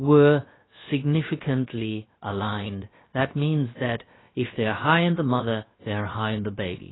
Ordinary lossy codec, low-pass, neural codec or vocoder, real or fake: AAC, 16 kbps; 7.2 kHz; codec, 16 kHz, about 1 kbps, DyCAST, with the encoder's durations; fake